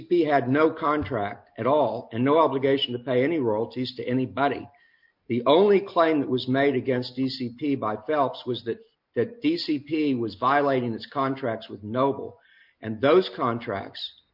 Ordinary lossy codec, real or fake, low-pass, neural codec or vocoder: MP3, 48 kbps; real; 5.4 kHz; none